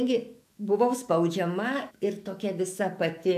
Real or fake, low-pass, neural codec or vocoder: fake; 14.4 kHz; autoencoder, 48 kHz, 128 numbers a frame, DAC-VAE, trained on Japanese speech